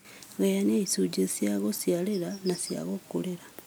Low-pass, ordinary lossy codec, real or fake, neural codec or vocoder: none; none; real; none